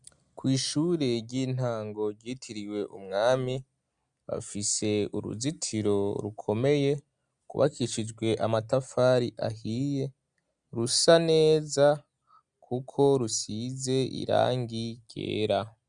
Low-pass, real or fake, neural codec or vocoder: 9.9 kHz; real; none